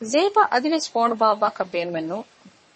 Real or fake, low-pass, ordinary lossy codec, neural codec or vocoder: fake; 10.8 kHz; MP3, 32 kbps; codec, 44.1 kHz, 3.4 kbps, Pupu-Codec